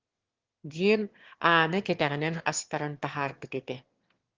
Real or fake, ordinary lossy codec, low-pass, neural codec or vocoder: fake; Opus, 16 kbps; 7.2 kHz; autoencoder, 22.05 kHz, a latent of 192 numbers a frame, VITS, trained on one speaker